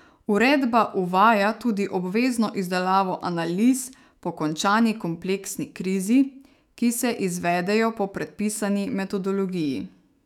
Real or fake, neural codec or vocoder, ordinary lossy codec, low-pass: fake; autoencoder, 48 kHz, 128 numbers a frame, DAC-VAE, trained on Japanese speech; none; 19.8 kHz